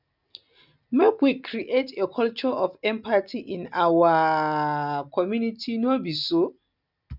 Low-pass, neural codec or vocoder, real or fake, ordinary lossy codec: 5.4 kHz; none; real; none